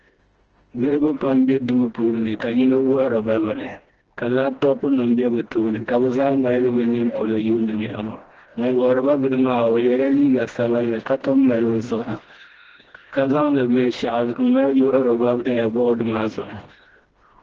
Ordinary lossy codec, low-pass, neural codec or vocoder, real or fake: Opus, 16 kbps; 7.2 kHz; codec, 16 kHz, 1 kbps, FreqCodec, smaller model; fake